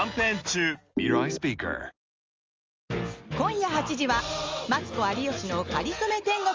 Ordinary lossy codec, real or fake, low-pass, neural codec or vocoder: Opus, 32 kbps; real; 7.2 kHz; none